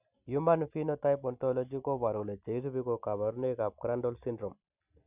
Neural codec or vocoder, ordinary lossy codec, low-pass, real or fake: none; none; 3.6 kHz; real